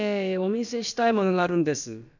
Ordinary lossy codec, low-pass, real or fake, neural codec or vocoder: none; 7.2 kHz; fake; codec, 16 kHz, about 1 kbps, DyCAST, with the encoder's durations